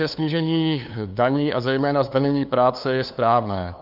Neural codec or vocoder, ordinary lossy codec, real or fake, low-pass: codec, 16 kHz, 2 kbps, FunCodec, trained on LibriTTS, 25 frames a second; Opus, 64 kbps; fake; 5.4 kHz